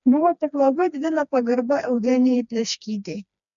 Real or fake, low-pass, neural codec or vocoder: fake; 7.2 kHz; codec, 16 kHz, 2 kbps, FreqCodec, smaller model